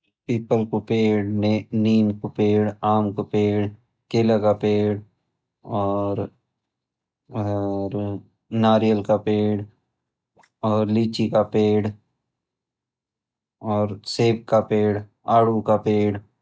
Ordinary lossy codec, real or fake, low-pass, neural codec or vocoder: none; real; none; none